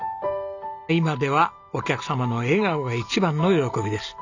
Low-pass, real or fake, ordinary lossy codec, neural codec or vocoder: 7.2 kHz; real; none; none